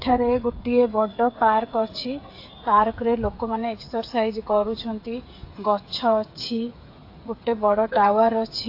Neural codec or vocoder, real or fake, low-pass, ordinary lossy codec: codec, 16 kHz, 8 kbps, FreqCodec, smaller model; fake; 5.4 kHz; AAC, 32 kbps